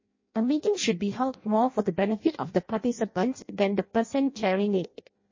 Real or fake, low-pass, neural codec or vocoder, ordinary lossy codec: fake; 7.2 kHz; codec, 16 kHz in and 24 kHz out, 0.6 kbps, FireRedTTS-2 codec; MP3, 32 kbps